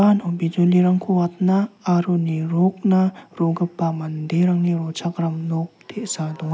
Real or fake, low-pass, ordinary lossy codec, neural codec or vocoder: real; none; none; none